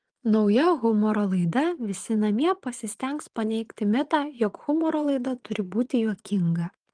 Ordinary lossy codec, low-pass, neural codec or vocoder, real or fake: Opus, 24 kbps; 9.9 kHz; vocoder, 22.05 kHz, 80 mel bands, Vocos; fake